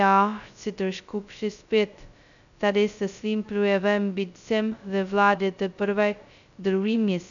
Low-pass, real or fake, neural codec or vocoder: 7.2 kHz; fake; codec, 16 kHz, 0.2 kbps, FocalCodec